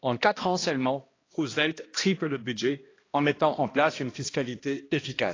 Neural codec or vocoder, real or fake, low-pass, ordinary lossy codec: codec, 16 kHz, 1 kbps, X-Codec, HuBERT features, trained on balanced general audio; fake; 7.2 kHz; AAC, 32 kbps